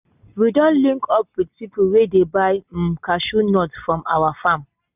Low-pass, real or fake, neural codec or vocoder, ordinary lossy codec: 3.6 kHz; real; none; none